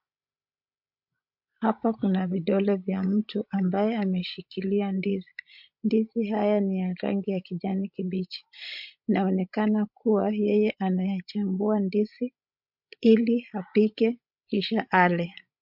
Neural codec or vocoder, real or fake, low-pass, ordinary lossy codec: codec, 16 kHz, 16 kbps, FreqCodec, larger model; fake; 5.4 kHz; MP3, 48 kbps